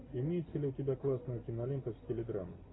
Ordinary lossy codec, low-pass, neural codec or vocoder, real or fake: AAC, 16 kbps; 7.2 kHz; none; real